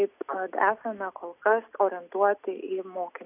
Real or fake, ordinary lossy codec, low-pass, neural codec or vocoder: real; AAC, 32 kbps; 3.6 kHz; none